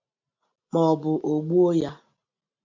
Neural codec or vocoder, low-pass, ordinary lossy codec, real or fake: none; 7.2 kHz; MP3, 48 kbps; real